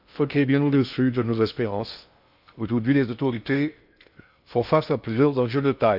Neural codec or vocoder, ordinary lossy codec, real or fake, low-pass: codec, 16 kHz in and 24 kHz out, 0.6 kbps, FocalCodec, streaming, 2048 codes; none; fake; 5.4 kHz